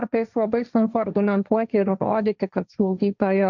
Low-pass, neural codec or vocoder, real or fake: 7.2 kHz; codec, 16 kHz, 1.1 kbps, Voila-Tokenizer; fake